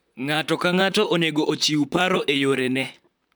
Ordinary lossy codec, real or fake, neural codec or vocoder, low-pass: none; fake; vocoder, 44.1 kHz, 128 mel bands, Pupu-Vocoder; none